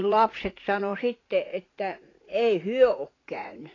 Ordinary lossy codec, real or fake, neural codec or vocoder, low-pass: AAC, 32 kbps; fake; vocoder, 44.1 kHz, 80 mel bands, Vocos; 7.2 kHz